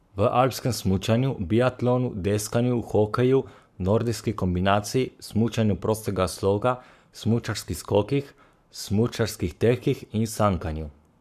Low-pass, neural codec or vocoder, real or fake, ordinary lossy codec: 14.4 kHz; codec, 44.1 kHz, 7.8 kbps, Pupu-Codec; fake; none